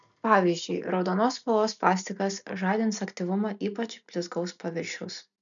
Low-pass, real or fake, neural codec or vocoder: 7.2 kHz; real; none